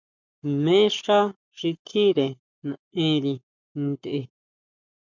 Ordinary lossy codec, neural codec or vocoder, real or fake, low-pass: MP3, 64 kbps; codec, 44.1 kHz, 7.8 kbps, Pupu-Codec; fake; 7.2 kHz